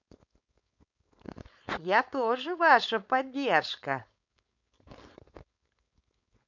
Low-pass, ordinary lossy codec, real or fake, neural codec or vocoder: 7.2 kHz; none; fake; codec, 16 kHz, 4.8 kbps, FACodec